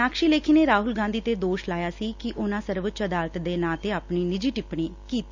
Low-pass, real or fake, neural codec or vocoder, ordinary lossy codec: 7.2 kHz; real; none; Opus, 64 kbps